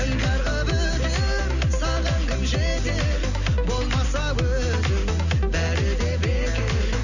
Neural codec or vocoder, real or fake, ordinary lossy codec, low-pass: none; real; none; 7.2 kHz